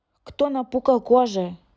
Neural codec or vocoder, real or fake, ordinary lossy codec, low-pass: none; real; none; none